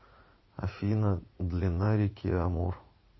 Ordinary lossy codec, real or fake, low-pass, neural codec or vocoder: MP3, 24 kbps; fake; 7.2 kHz; vocoder, 44.1 kHz, 80 mel bands, Vocos